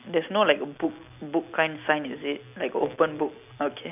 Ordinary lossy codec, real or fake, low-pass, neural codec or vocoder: none; real; 3.6 kHz; none